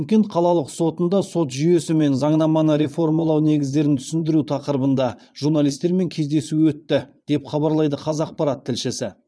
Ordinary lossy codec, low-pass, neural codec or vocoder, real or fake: none; none; vocoder, 22.05 kHz, 80 mel bands, Vocos; fake